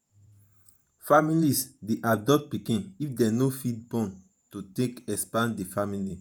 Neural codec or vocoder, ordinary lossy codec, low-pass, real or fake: vocoder, 48 kHz, 128 mel bands, Vocos; none; none; fake